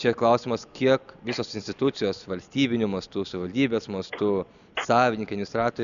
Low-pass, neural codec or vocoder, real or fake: 7.2 kHz; none; real